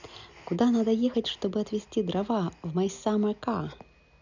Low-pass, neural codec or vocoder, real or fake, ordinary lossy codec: 7.2 kHz; none; real; none